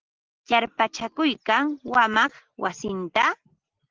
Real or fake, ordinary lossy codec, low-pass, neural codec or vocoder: real; Opus, 16 kbps; 7.2 kHz; none